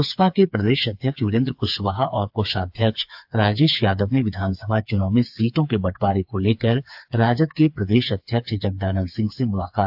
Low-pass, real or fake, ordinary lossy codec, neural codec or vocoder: 5.4 kHz; fake; AAC, 48 kbps; codec, 16 kHz, 4 kbps, FreqCodec, smaller model